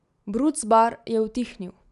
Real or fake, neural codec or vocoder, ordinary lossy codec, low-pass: real; none; none; 10.8 kHz